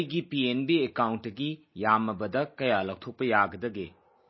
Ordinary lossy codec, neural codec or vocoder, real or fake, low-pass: MP3, 24 kbps; none; real; 7.2 kHz